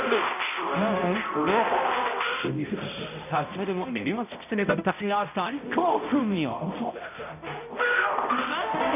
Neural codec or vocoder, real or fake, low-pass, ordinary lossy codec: codec, 16 kHz, 0.5 kbps, X-Codec, HuBERT features, trained on balanced general audio; fake; 3.6 kHz; none